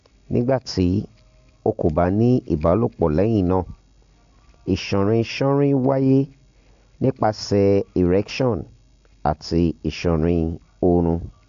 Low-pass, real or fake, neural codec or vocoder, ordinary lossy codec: 7.2 kHz; real; none; AAC, 64 kbps